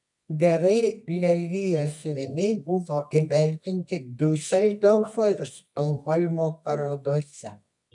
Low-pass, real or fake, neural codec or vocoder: 10.8 kHz; fake; codec, 24 kHz, 0.9 kbps, WavTokenizer, medium music audio release